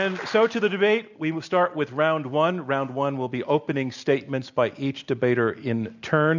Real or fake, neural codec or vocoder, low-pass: real; none; 7.2 kHz